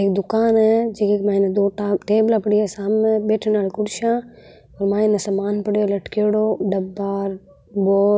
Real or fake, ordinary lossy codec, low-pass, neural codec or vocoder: real; none; none; none